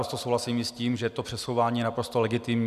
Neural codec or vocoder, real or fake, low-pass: none; real; 14.4 kHz